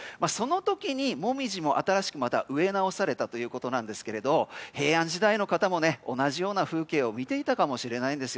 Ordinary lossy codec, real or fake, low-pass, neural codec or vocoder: none; real; none; none